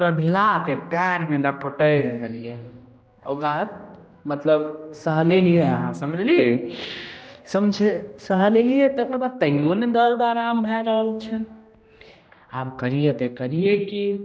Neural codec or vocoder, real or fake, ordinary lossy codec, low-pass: codec, 16 kHz, 1 kbps, X-Codec, HuBERT features, trained on general audio; fake; none; none